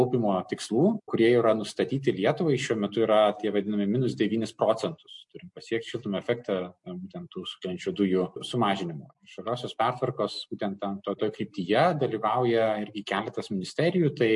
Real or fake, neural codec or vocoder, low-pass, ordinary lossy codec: real; none; 10.8 kHz; MP3, 48 kbps